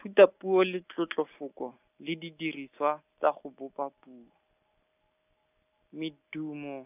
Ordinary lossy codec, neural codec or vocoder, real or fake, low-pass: none; none; real; 3.6 kHz